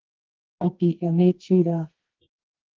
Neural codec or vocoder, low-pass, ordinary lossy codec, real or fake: codec, 24 kHz, 0.9 kbps, WavTokenizer, medium music audio release; 7.2 kHz; Opus, 16 kbps; fake